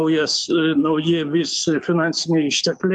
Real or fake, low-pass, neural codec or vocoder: fake; 10.8 kHz; codec, 44.1 kHz, 7.8 kbps, DAC